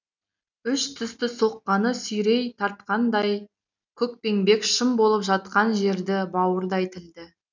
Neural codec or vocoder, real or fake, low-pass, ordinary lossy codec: none; real; 7.2 kHz; none